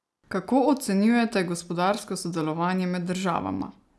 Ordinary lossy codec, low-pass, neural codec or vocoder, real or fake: none; none; none; real